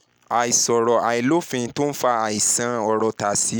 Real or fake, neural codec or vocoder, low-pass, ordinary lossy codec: real; none; none; none